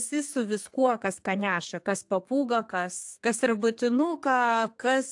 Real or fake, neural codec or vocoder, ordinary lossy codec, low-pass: fake; codec, 32 kHz, 1.9 kbps, SNAC; AAC, 64 kbps; 10.8 kHz